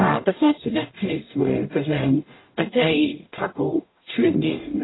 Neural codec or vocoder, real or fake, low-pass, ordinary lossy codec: codec, 44.1 kHz, 0.9 kbps, DAC; fake; 7.2 kHz; AAC, 16 kbps